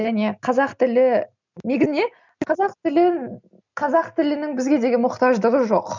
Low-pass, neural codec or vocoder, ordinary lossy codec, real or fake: 7.2 kHz; none; none; real